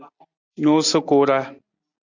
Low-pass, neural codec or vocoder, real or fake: 7.2 kHz; none; real